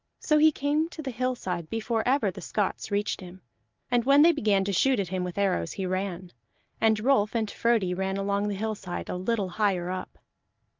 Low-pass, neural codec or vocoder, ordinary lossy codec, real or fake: 7.2 kHz; none; Opus, 32 kbps; real